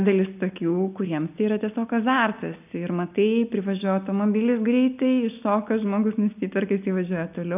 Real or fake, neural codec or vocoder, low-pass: real; none; 3.6 kHz